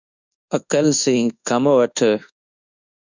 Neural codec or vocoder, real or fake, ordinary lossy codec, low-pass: codec, 24 kHz, 1.2 kbps, DualCodec; fake; Opus, 64 kbps; 7.2 kHz